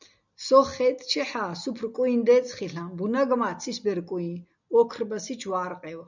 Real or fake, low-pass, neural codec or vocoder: real; 7.2 kHz; none